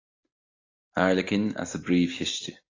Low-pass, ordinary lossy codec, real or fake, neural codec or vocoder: 7.2 kHz; AAC, 32 kbps; real; none